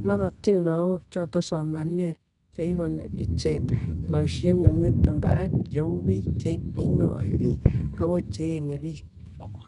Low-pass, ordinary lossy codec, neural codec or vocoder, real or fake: 10.8 kHz; none; codec, 24 kHz, 0.9 kbps, WavTokenizer, medium music audio release; fake